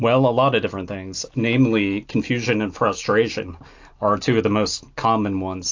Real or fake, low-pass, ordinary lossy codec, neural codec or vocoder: real; 7.2 kHz; AAC, 48 kbps; none